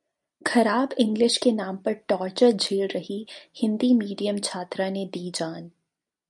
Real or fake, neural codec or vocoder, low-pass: real; none; 10.8 kHz